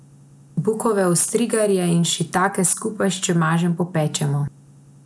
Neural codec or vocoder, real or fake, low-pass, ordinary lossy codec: none; real; none; none